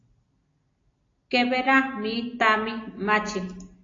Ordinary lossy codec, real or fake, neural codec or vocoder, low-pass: AAC, 32 kbps; real; none; 7.2 kHz